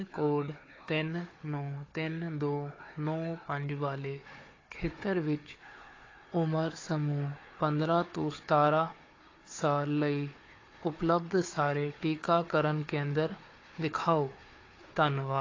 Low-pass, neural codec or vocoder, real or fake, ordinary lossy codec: 7.2 kHz; codec, 16 kHz, 4 kbps, FunCodec, trained on Chinese and English, 50 frames a second; fake; AAC, 32 kbps